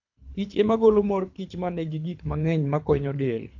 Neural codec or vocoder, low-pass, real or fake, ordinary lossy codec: codec, 24 kHz, 3 kbps, HILCodec; 7.2 kHz; fake; AAC, 48 kbps